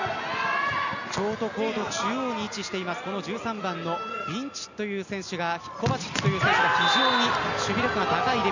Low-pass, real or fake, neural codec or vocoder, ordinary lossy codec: 7.2 kHz; real; none; none